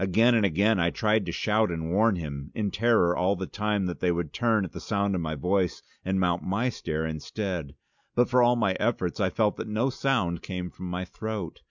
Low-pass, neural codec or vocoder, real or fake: 7.2 kHz; none; real